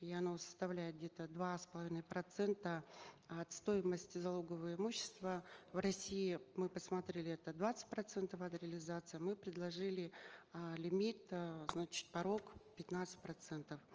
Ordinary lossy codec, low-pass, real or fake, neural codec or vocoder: Opus, 24 kbps; 7.2 kHz; real; none